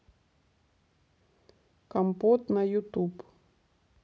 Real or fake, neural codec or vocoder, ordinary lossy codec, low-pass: real; none; none; none